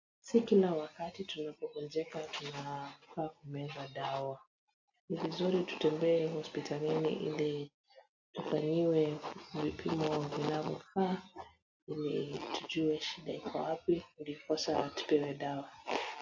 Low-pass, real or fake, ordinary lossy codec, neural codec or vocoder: 7.2 kHz; real; AAC, 48 kbps; none